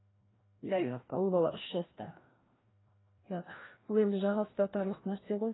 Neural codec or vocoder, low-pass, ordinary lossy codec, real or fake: codec, 16 kHz, 1 kbps, FreqCodec, larger model; 7.2 kHz; AAC, 16 kbps; fake